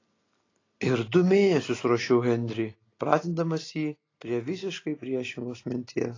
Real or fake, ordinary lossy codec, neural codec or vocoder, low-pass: real; AAC, 32 kbps; none; 7.2 kHz